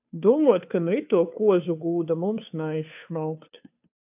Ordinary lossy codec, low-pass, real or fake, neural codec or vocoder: AAC, 32 kbps; 3.6 kHz; fake; codec, 16 kHz, 2 kbps, FunCodec, trained on Chinese and English, 25 frames a second